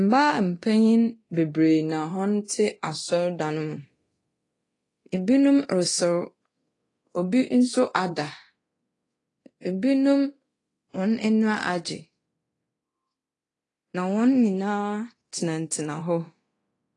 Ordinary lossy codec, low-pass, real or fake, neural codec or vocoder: AAC, 32 kbps; 10.8 kHz; fake; codec, 24 kHz, 0.9 kbps, DualCodec